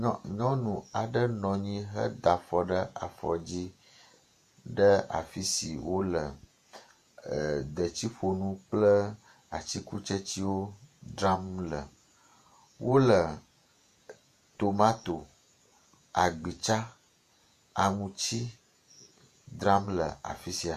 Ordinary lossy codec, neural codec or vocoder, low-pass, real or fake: MP3, 64 kbps; vocoder, 48 kHz, 128 mel bands, Vocos; 14.4 kHz; fake